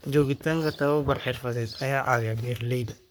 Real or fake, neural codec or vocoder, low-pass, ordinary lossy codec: fake; codec, 44.1 kHz, 3.4 kbps, Pupu-Codec; none; none